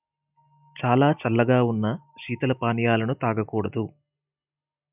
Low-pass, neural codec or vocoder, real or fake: 3.6 kHz; none; real